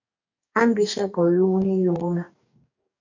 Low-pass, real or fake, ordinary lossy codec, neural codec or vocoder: 7.2 kHz; fake; AAC, 48 kbps; codec, 44.1 kHz, 2.6 kbps, DAC